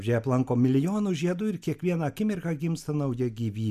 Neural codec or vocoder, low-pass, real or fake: none; 14.4 kHz; real